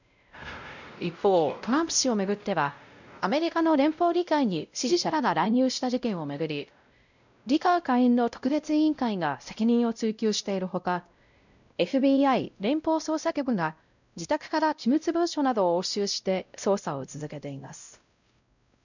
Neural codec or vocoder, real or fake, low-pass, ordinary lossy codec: codec, 16 kHz, 0.5 kbps, X-Codec, WavLM features, trained on Multilingual LibriSpeech; fake; 7.2 kHz; none